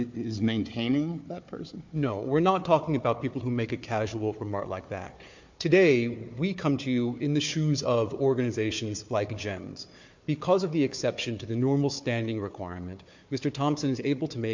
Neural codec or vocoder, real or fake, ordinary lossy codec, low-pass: codec, 16 kHz, 4 kbps, FunCodec, trained on Chinese and English, 50 frames a second; fake; MP3, 48 kbps; 7.2 kHz